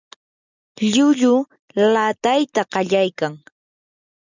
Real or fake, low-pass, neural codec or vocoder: real; 7.2 kHz; none